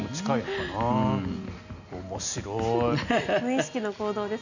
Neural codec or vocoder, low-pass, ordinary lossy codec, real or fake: none; 7.2 kHz; none; real